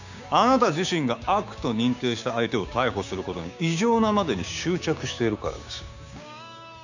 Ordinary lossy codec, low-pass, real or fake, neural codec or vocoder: none; 7.2 kHz; fake; codec, 16 kHz, 6 kbps, DAC